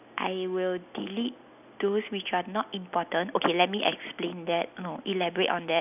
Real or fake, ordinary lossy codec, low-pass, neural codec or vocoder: real; none; 3.6 kHz; none